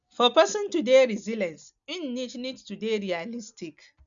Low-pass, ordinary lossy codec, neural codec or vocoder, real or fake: 7.2 kHz; none; none; real